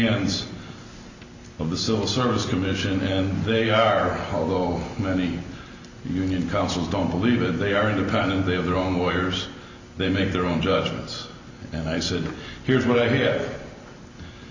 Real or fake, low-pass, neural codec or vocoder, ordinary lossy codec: real; 7.2 kHz; none; Opus, 64 kbps